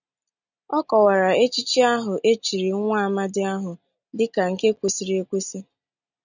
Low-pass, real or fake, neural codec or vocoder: 7.2 kHz; real; none